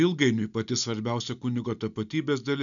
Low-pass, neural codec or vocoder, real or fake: 7.2 kHz; none; real